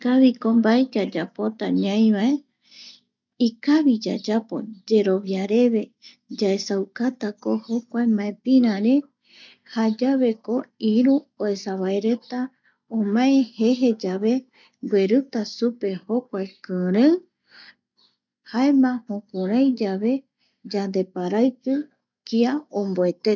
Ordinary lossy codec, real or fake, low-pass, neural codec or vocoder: none; real; 7.2 kHz; none